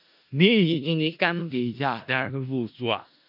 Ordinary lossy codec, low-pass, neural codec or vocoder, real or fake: AAC, 48 kbps; 5.4 kHz; codec, 16 kHz in and 24 kHz out, 0.4 kbps, LongCat-Audio-Codec, four codebook decoder; fake